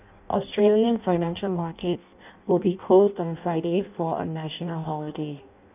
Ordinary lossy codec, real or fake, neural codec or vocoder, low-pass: none; fake; codec, 16 kHz in and 24 kHz out, 0.6 kbps, FireRedTTS-2 codec; 3.6 kHz